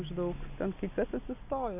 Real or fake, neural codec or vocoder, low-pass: real; none; 3.6 kHz